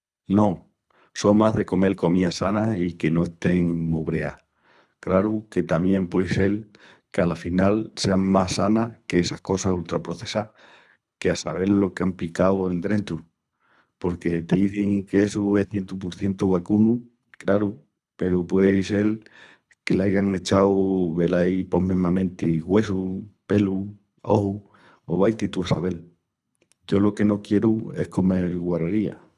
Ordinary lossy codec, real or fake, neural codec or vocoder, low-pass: none; fake; codec, 24 kHz, 3 kbps, HILCodec; none